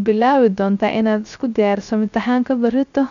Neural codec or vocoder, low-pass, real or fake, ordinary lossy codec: codec, 16 kHz, 0.3 kbps, FocalCodec; 7.2 kHz; fake; none